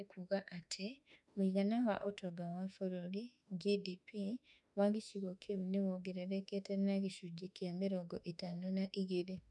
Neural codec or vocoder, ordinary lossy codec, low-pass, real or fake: autoencoder, 48 kHz, 32 numbers a frame, DAC-VAE, trained on Japanese speech; none; 10.8 kHz; fake